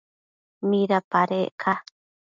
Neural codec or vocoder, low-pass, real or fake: none; 7.2 kHz; real